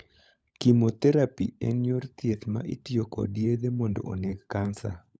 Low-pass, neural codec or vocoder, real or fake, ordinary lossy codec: none; codec, 16 kHz, 16 kbps, FunCodec, trained on LibriTTS, 50 frames a second; fake; none